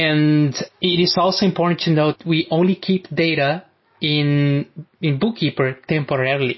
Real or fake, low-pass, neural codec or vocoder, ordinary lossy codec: real; 7.2 kHz; none; MP3, 24 kbps